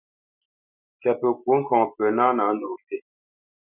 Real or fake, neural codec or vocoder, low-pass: real; none; 3.6 kHz